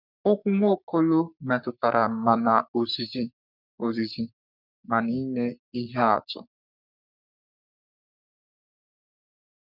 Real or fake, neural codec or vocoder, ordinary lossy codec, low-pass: fake; codec, 44.1 kHz, 3.4 kbps, Pupu-Codec; none; 5.4 kHz